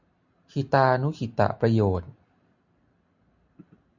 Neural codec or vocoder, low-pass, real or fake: none; 7.2 kHz; real